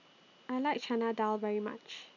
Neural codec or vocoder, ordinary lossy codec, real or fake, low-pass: none; none; real; 7.2 kHz